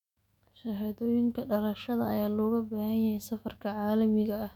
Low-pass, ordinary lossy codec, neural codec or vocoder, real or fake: 19.8 kHz; none; autoencoder, 48 kHz, 128 numbers a frame, DAC-VAE, trained on Japanese speech; fake